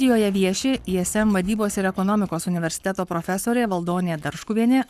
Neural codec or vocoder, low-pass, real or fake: codec, 44.1 kHz, 7.8 kbps, Pupu-Codec; 14.4 kHz; fake